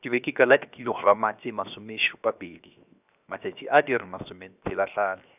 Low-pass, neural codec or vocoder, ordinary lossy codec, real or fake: 3.6 kHz; codec, 16 kHz, 0.7 kbps, FocalCodec; none; fake